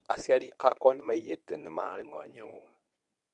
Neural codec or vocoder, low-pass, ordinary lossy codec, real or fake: codec, 24 kHz, 0.9 kbps, WavTokenizer, medium speech release version 1; 10.8 kHz; none; fake